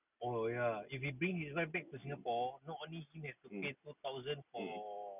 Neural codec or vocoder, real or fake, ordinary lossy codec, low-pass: none; real; none; 3.6 kHz